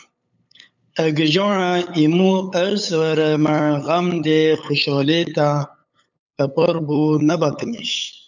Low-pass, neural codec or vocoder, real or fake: 7.2 kHz; codec, 16 kHz, 8 kbps, FunCodec, trained on LibriTTS, 25 frames a second; fake